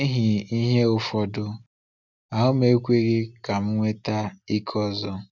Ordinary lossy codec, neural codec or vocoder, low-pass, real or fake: none; none; 7.2 kHz; real